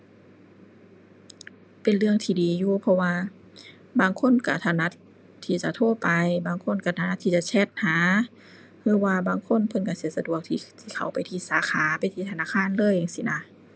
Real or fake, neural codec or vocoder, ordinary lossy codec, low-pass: real; none; none; none